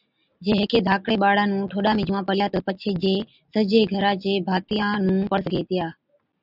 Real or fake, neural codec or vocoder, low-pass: real; none; 5.4 kHz